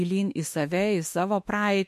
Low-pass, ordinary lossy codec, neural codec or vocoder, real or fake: 14.4 kHz; MP3, 64 kbps; autoencoder, 48 kHz, 32 numbers a frame, DAC-VAE, trained on Japanese speech; fake